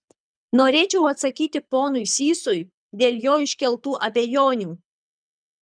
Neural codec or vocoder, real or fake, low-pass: codec, 24 kHz, 6 kbps, HILCodec; fake; 9.9 kHz